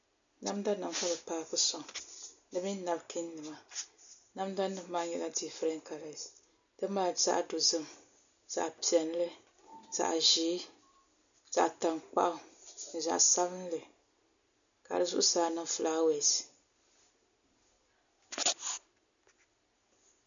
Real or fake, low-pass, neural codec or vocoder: real; 7.2 kHz; none